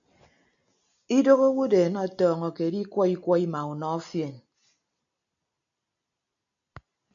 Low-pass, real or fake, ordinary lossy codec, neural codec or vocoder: 7.2 kHz; real; MP3, 96 kbps; none